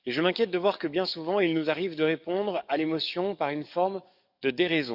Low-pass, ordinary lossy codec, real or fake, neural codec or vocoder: 5.4 kHz; none; fake; codec, 44.1 kHz, 7.8 kbps, DAC